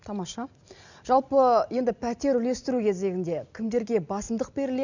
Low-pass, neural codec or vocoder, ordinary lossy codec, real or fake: 7.2 kHz; none; none; real